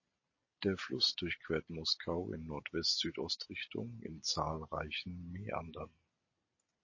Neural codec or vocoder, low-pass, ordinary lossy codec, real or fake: none; 7.2 kHz; MP3, 32 kbps; real